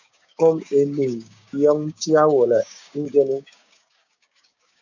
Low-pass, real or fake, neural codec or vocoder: 7.2 kHz; fake; codec, 16 kHz, 6 kbps, DAC